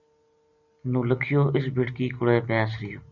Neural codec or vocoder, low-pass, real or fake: none; 7.2 kHz; real